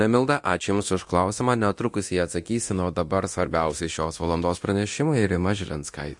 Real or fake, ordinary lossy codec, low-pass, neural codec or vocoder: fake; MP3, 48 kbps; 10.8 kHz; codec, 24 kHz, 0.9 kbps, DualCodec